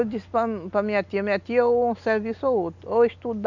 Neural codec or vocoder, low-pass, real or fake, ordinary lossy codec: none; 7.2 kHz; real; none